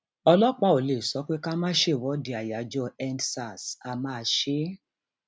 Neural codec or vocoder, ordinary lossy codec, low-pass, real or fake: none; none; none; real